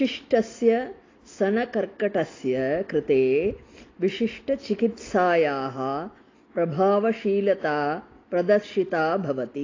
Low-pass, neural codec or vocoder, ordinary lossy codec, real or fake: 7.2 kHz; none; AAC, 32 kbps; real